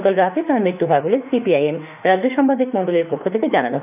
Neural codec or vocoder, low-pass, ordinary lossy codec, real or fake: autoencoder, 48 kHz, 32 numbers a frame, DAC-VAE, trained on Japanese speech; 3.6 kHz; none; fake